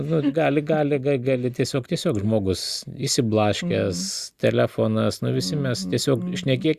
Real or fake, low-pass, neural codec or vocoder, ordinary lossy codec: real; 14.4 kHz; none; Opus, 64 kbps